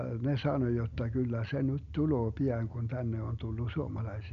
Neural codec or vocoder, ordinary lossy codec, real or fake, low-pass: none; none; real; 7.2 kHz